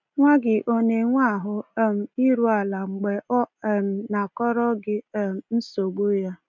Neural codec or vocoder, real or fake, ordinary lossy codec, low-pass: none; real; none; 7.2 kHz